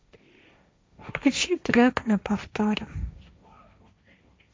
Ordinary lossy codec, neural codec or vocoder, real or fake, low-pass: none; codec, 16 kHz, 1.1 kbps, Voila-Tokenizer; fake; none